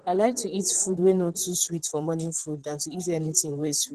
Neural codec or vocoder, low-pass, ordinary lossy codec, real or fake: vocoder, 44.1 kHz, 128 mel bands, Pupu-Vocoder; 14.4 kHz; Opus, 16 kbps; fake